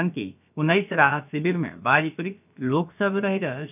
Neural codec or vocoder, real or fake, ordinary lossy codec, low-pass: codec, 16 kHz, about 1 kbps, DyCAST, with the encoder's durations; fake; none; 3.6 kHz